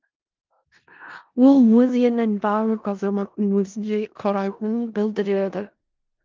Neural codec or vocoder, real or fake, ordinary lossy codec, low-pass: codec, 16 kHz in and 24 kHz out, 0.4 kbps, LongCat-Audio-Codec, four codebook decoder; fake; Opus, 32 kbps; 7.2 kHz